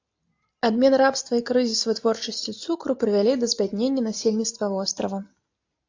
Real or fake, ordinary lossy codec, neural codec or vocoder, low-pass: real; AAC, 48 kbps; none; 7.2 kHz